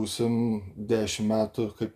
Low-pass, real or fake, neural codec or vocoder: 14.4 kHz; real; none